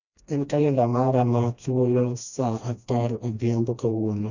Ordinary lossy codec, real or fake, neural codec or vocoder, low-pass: none; fake; codec, 16 kHz, 1 kbps, FreqCodec, smaller model; 7.2 kHz